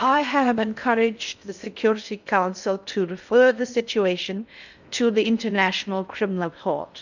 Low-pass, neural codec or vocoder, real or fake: 7.2 kHz; codec, 16 kHz in and 24 kHz out, 0.6 kbps, FocalCodec, streaming, 2048 codes; fake